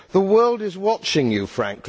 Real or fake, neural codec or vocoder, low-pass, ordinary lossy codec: real; none; none; none